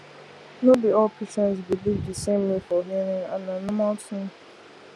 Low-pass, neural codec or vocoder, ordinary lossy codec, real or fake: none; none; none; real